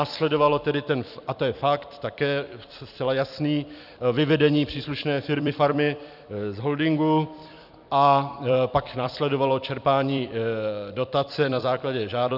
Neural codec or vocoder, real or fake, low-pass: vocoder, 44.1 kHz, 128 mel bands every 256 samples, BigVGAN v2; fake; 5.4 kHz